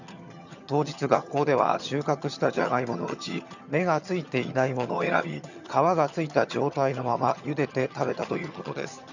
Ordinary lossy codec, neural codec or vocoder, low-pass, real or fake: none; vocoder, 22.05 kHz, 80 mel bands, HiFi-GAN; 7.2 kHz; fake